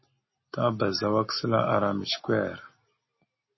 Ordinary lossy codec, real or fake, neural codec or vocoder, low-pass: MP3, 24 kbps; real; none; 7.2 kHz